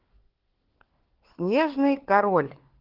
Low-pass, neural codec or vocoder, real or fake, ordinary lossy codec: 5.4 kHz; codec, 16 kHz, 4 kbps, FunCodec, trained on LibriTTS, 50 frames a second; fake; Opus, 16 kbps